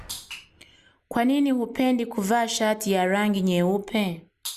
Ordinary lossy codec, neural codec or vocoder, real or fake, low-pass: Opus, 64 kbps; none; real; 14.4 kHz